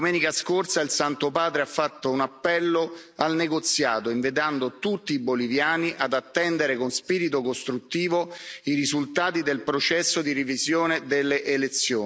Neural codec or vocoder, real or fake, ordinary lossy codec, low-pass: none; real; none; none